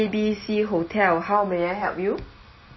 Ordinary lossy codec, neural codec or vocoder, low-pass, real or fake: MP3, 24 kbps; none; 7.2 kHz; real